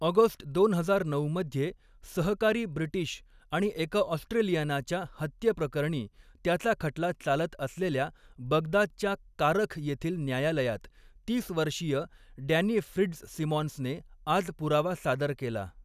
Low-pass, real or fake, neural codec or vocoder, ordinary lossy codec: 14.4 kHz; real; none; none